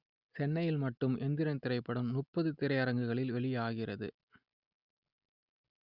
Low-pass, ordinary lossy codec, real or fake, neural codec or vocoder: 5.4 kHz; Opus, 64 kbps; real; none